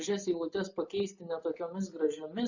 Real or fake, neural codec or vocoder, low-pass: real; none; 7.2 kHz